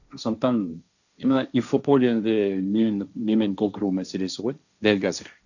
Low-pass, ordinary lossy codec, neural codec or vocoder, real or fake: 7.2 kHz; none; codec, 16 kHz, 1.1 kbps, Voila-Tokenizer; fake